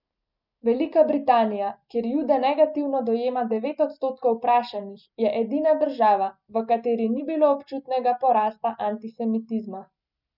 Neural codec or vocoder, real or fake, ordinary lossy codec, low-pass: none; real; none; 5.4 kHz